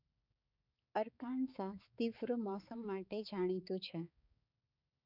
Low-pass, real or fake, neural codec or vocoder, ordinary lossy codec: 5.4 kHz; fake; codec, 16 kHz, 4 kbps, X-Codec, HuBERT features, trained on balanced general audio; none